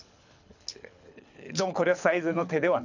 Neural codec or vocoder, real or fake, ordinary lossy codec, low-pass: codec, 24 kHz, 6 kbps, HILCodec; fake; none; 7.2 kHz